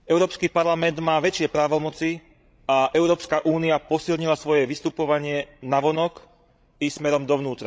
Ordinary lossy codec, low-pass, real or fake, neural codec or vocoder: none; none; fake; codec, 16 kHz, 16 kbps, FreqCodec, larger model